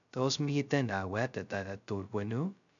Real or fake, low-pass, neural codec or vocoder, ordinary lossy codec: fake; 7.2 kHz; codec, 16 kHz, 0.2 kbps, FocalCodec; none